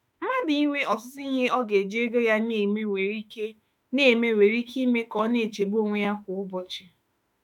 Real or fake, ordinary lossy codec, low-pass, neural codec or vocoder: fake; none; 19.8 kHz; autoencoder, 48 kHz, 32 numbers a frame, DAC-VAE, trained on Japanese speech